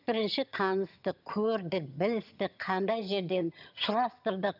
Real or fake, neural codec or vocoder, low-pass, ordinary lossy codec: fake; vocoder, 22.05 kHz, 80 mel bands, HiFi-GAN; 5.4 kHz; none